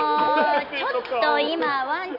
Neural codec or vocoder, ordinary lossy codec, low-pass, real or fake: none; none; 5.4 kHz; real